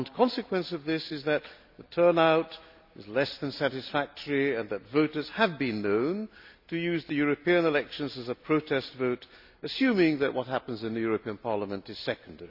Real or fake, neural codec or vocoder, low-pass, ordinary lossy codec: real; none; 5.4 kHz; none